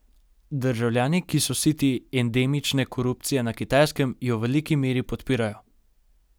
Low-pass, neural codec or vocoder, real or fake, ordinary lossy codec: none; none; real; none